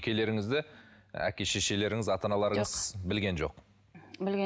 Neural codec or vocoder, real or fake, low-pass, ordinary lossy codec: none; real; none; none